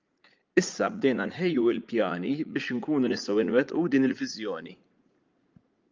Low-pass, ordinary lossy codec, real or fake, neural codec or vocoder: 7.2 kHz; Opus, 32 kbps; fake; vocoder, 44.1 kHz, 80 mel bands, Vocos